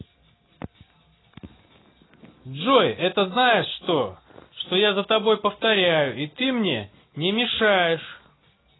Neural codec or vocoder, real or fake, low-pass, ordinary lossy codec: none; real; 7.2 kHz; AAC, 16 kbps